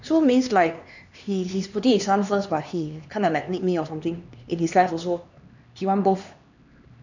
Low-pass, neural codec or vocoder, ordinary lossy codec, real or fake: 7.2 kHz; codec, 16 kHz, 2 kbps, X-Codec, HuBERT features, trained on LibriSpeech; none; fake